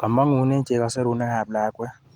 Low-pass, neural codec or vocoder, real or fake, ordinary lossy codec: 19.8 kHz; none; real; Opus, 24 kbps